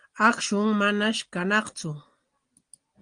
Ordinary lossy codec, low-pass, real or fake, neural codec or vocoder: Opus, 24 kbps; 9.9 kHz; real; none